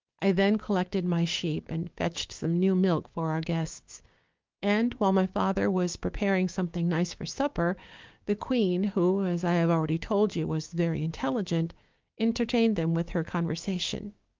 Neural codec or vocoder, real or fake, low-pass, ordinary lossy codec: codec, 16 kHz, 6 kbps, DAC; fake; 7.2 kHz; Opus, 32 kbps